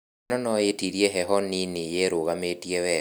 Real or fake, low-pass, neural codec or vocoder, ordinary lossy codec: real; none; none; none